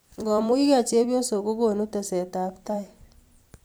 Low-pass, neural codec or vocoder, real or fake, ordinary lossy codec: none; vocoder, 44.1 kHz, 128 mel bands every 256 samples, BigVGAN v2; fake; none